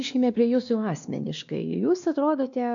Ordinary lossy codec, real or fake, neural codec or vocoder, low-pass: MP3, 64 kbps; fake; codec, 16 kHz, 2 kbps, X-Codec, WavLM features, trained on Multilingual LibriSpeech; 7.2 kHz